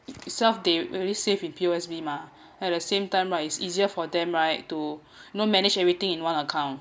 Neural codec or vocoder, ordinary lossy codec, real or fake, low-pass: none; none; real; none